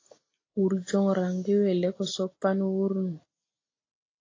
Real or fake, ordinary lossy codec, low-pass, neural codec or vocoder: real; AAC, 32 kbps; 7.2 kHz; none